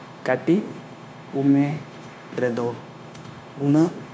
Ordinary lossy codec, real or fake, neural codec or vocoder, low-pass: none; fake; codec, 16 kHz, 0.9 kbps, LongCat-Audio-Codec; none